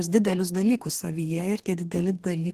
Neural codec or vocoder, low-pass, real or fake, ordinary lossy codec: codec, 32 kHz, 1.9 kbps, SNAC; 14.4 kHz; fake; Opus, 16 kbps